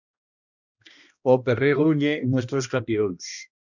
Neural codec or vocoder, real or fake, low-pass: codec, 16 kHz, 1 kbps, X-Codec, HuBERT features, trained on balanced general audio; fake; 7.2 kHz